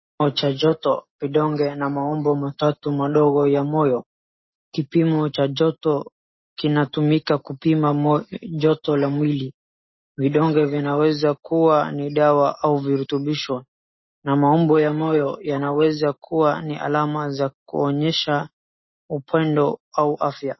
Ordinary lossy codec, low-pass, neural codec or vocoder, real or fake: MP3, 24 kbps; 7.2 kHz; none; real